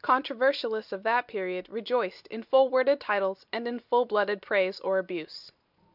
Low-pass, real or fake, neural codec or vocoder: 5.4 kHz; real; none